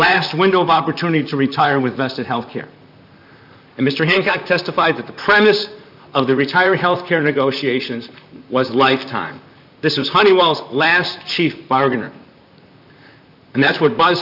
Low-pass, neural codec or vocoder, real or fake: 5.4 kHz; vocoder, 44.1 kHz, 128 mel bands, Pupu-Vocoder; fake